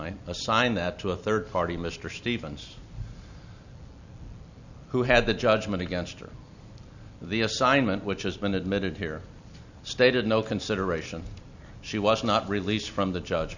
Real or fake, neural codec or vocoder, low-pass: real; none; 7.2 kHz